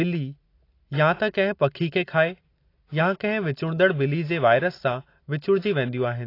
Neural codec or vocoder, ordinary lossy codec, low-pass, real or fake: none; AAC, 32 kbps; 5.4 kHz; real